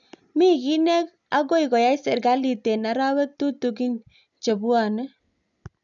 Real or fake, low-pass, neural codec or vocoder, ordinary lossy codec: real; 7.2 kHz; none; none